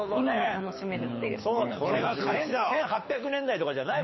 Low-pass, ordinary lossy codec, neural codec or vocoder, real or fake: 7.2 kHz; MP3, 24 kbps; codec, 24 kHz, 6 kbps, HILCodec; fake